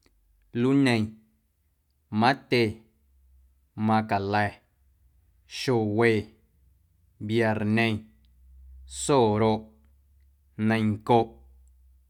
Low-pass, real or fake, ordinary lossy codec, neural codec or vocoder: 19.8 kHz; real; none; none